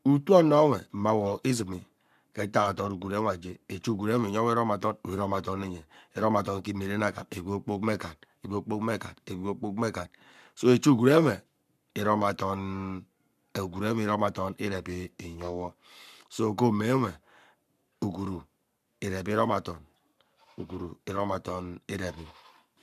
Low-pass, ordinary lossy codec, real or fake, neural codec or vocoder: 14.4 kHz; none; fake; codec, 44.1 kHz, 7.8 kbps, Pupu-Codec